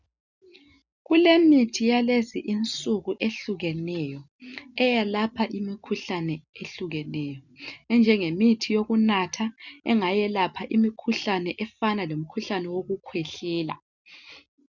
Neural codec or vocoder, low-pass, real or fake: none; 7.2 kHz; real